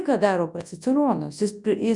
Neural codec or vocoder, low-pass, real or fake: codec, 24 kHz, 0.9 kbps, WavTokenizer, large speech release; 10.8 kHz; fake